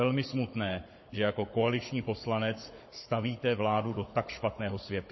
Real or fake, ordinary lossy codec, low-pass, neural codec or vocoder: fake; MP3, 24 kbps; 7.2 kHz; codec, 16 kHz, 16 kbps, FunCodec, trained on Chinese and English, 50 frames a second